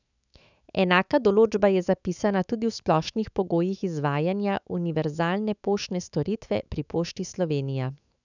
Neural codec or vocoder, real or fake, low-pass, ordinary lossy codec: autoencoder, 48 kHz, 128 numbers a frame, DAC-VAE, trained on Japanese speech; fake; 7.2 kHz; none